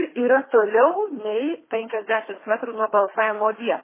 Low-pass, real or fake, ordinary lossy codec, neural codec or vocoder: 3.6 kHz; fake; MP3, 16 kbps; codec, 24 kHz, 3 kbps, HILCodec